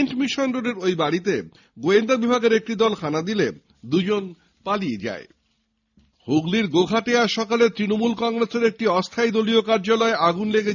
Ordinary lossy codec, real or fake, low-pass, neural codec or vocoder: none; real; 7.2 kHz; none